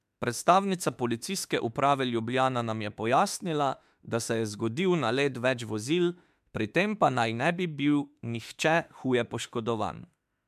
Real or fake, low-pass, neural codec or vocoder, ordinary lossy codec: fake; 14.4 kHz; autoencoder, 48 kHz, 32 numbers a frame, DAC-VAE, trained on Japanese speech; MP3, 96 kbps